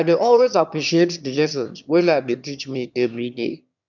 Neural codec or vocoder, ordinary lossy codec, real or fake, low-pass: autoencoder, 22.05 kHz, a latent of 192 numbers a frame, VITS, trained on one speaker; none; fake; 7.2 kHz